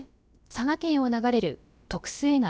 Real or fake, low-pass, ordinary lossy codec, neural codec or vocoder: fake; none; none; codec, 16 kHz, about 1 kbps, DyCAST, with the encoder's durations